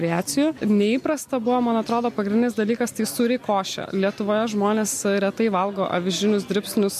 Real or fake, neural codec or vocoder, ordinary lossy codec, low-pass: real; none; MP3, 64 kbps; 14.4 kHz